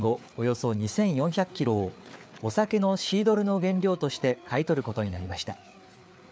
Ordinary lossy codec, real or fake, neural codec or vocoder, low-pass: none; fake; codec, 16 kHz, 4 kbps, FreqCodec, larger model; none